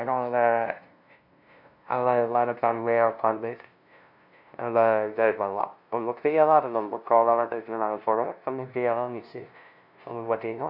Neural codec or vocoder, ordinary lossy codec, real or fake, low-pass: codec, 16 kHz, 0.5 kbps, FunCodec, trained on LibriTTS, 25 frames a second; none; fake; 5.4 kHz